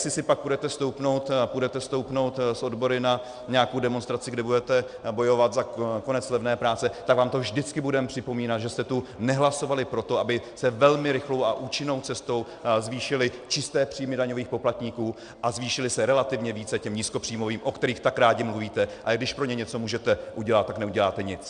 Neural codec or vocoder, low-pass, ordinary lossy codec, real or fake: none; 9.9 kHz; Opus, 64 kbps; real